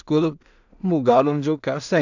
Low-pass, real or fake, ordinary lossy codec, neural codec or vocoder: 7.2 kHz; fake; none; codec, 16 kHz in and 24 kHz out, 0.4 kbps, LongCat-Audio-Codec, two codebook decoder